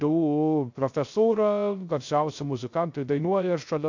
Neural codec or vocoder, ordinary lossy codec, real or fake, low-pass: codec, 16 kHz, 0.3 kbps, FocalCodec; AAC, 48 kbps; fake; 7.2 kHz